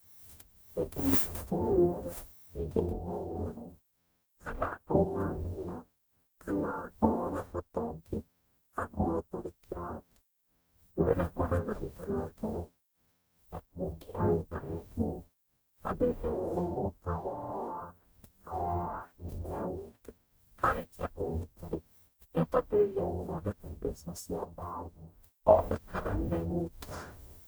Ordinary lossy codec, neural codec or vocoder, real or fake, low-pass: none; codec, 44.1 kHz, 0.9 kbps, DAC; fake; none